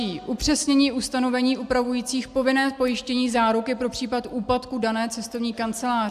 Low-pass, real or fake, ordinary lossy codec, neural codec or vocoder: 14.4 kHz; real; AAC, 96 kbps; none